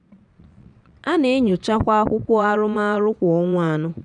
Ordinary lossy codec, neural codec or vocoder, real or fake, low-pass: none; vocoder, 22.05 kHz, 80 mel bands, Vocos; fake; 9.9 kHz